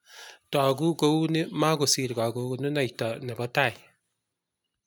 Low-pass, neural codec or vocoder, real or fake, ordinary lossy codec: none; none; real; none